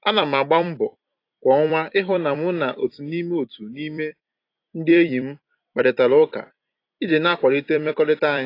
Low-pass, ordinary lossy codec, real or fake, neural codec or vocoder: 5.4 kHz; AAC, 32 kbps; fake; vocoder, 44.1 kHz, 128 mel bands every 256 samples, BigVGAN v2